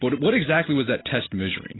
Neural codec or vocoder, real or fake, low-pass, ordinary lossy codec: none; real; 7.2 kHz; AAC, 16 kbps